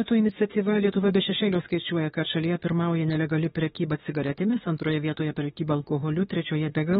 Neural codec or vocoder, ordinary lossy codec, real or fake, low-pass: autoencoder, 48 kHz, 32 numbers a frame, DAC-VAE, trained on Japanese speech; AAC, 16 kbps; fake; 19.8 kHz